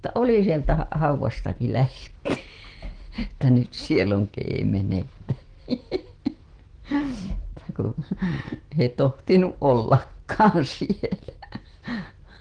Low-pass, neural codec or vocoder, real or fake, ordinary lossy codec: 9.9 kHz; autoencoder, 48 kHz, 128 numbers a frame, DAC-VAE, trained on Japanese speech; fake; Opus, 16 kbps